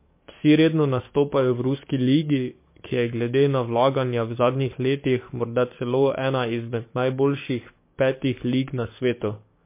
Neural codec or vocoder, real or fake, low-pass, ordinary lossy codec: codec, 44.1 kHz, 7.8 kbps, DAC; fake; 3.6 kHz; MP3, 24 kbps